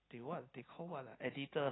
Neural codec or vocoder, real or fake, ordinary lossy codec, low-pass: none; real; AAC, 16 kbps; 7.2 kHz